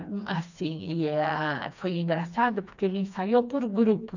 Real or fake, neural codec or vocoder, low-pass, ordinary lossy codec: fake; codec, 16 kHz, 2 kbps, FreqCodec, smaller model; 7.2 kHz; none